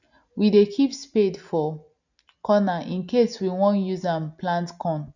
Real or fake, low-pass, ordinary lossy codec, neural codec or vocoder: real; 7.2 kHz; none; none